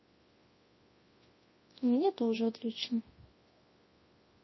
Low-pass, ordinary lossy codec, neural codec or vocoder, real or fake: 7.2 kHz; MP3, 24 kbps; codec, 24 kHz, 0.9 kbps, WavTokenizer, large speech release; fake